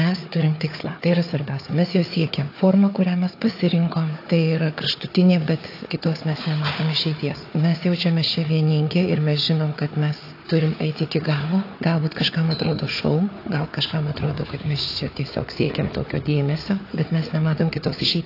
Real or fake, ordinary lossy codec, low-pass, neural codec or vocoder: fake; AAC, 32 kbps; 5.4 kHz; codec, 16 kHz, 4 kbps, FunCodec, trained on Chinese and English, 50 frames a second